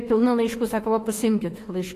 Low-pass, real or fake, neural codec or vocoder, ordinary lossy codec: 14.4 kHz; fake; autoencoder, 48 kHz, 32 numbers a frame, DAC-VAE, trained on Japanese speech; AAC, 48 kbps